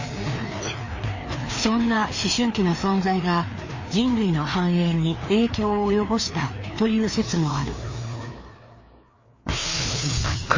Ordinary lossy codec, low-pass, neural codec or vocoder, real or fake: MP3, 32 kbps; 7.2 kHz; codec, 16 kHz, 2 kbps, FreqCodec, larger model; fake